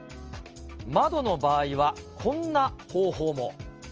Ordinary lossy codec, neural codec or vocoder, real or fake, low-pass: Opus, 24 kbps; none; real; 7.2 kHz